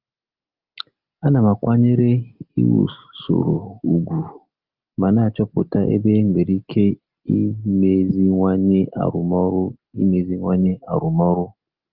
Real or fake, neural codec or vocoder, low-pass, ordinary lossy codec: real; none; 5.4 kHz; Opus, 24 kbps